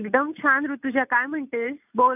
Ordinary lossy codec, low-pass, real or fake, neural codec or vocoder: none; 3.6 kHz; real; none